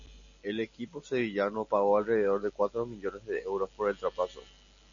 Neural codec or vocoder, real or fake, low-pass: none; real; 7.2 kHz